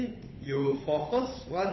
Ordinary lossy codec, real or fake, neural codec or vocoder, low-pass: MP3, 24 kbps; fake; codec, 16 kHz, 8 kbps, FunCodec, trained on Chinese and English, 25 frames a second; 7.2 kHz